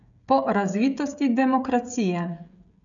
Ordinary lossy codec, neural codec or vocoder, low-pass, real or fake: none; codec, 16 kHz, 16 kbps, FreqCodec, smaller model; 7.2 kHz; fake